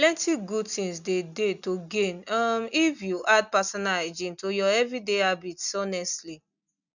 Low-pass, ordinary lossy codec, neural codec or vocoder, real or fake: 7.2 kHz; none; none; real